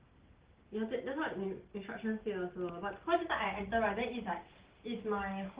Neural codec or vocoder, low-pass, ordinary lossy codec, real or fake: none; 3.6 kHz; Opus, 16 kbps; real